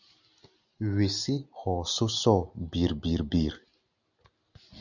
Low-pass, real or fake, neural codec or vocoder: 7.2 kHz; real; none